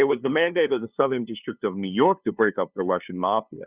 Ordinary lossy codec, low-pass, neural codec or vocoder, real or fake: Opus, 64 kbps; 3.6 kHz; codec, 16 kHz, 2 kbps, FunCodec, trained on LibriTTS, 25 frames a second; fake